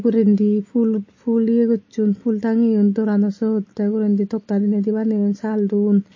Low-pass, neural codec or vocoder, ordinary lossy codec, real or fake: 7.2 kHz; none; MP3, 32 kbps; real